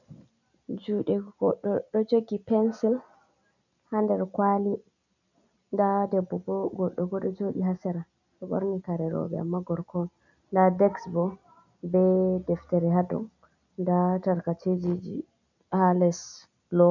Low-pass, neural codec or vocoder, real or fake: 7.2 kHz; none; real